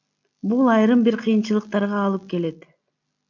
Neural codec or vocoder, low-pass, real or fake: none; 7.2 kHz; real